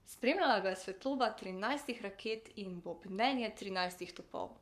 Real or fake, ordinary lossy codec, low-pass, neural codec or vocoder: fake; none; 14.4 kHz; codec, 44.1 kHz, 7.8 kbps, Pupu-Codec